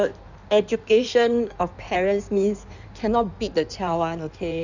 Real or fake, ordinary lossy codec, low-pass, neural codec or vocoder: fake; none; 7.2 kHz; codec, 16 kHz in and 24 kHz out, 1.1 kbps, FireRedTTS-2 codec